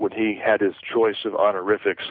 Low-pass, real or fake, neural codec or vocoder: 5.4 kHz; real; none